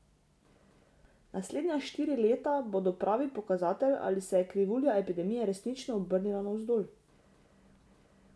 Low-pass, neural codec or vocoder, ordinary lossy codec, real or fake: none; none; none; real